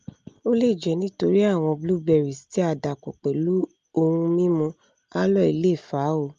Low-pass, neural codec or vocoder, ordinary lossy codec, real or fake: 7.2 kHz; none; Opus, 32 kbps; real